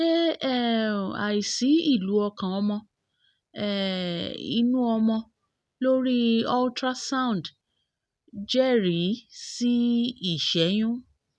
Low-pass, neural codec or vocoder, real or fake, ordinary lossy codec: none; none; real; none